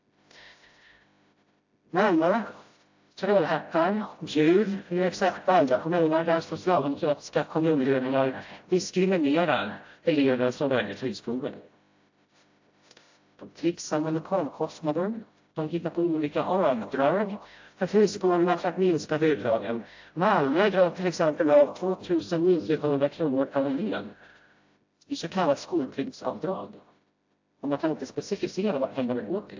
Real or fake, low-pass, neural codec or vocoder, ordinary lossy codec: fake; 7.2 kHz; codec, 16 kHz, 0.5 kbps, FreqCodec, smaller model; AAC, 48 kbps